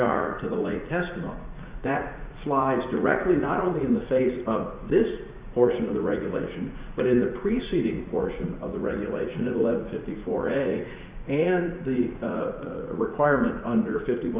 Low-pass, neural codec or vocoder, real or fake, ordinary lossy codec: 3.6 kHz; vocoder, 44.1 kHz, 80 mel bands, Vocos; fake; Opus, 64 kbps